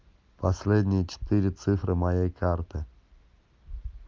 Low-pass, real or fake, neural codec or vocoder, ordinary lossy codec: 7.2 kHz; real; none; Opus, 24 kbps